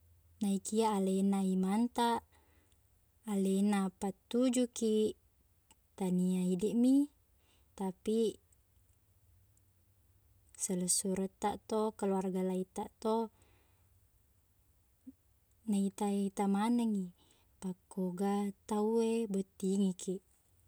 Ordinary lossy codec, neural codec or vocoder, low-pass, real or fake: none; none; none; real